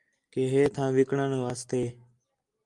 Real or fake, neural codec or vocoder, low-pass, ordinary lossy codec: real; none; 10.8 kHz; Opus, 32 kbps